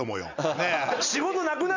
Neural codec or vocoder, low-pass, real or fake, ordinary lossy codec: none; 7.2 kHz; real; none